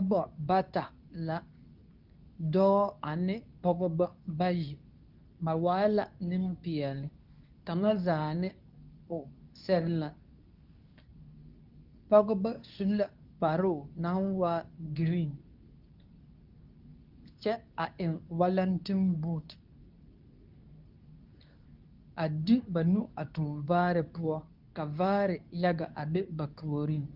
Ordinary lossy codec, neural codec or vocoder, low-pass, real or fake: Opus, 24 kbps; codec, 24 kHz, 0.9 kbps, WavTokenizer, medium speech release version 2; 5.4 kHz; fake